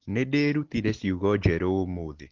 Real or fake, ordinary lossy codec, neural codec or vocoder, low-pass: real; Opus, 16 kbps; none; 7.2 kHz